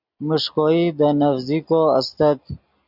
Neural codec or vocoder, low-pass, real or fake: none; 5.4 kHz; real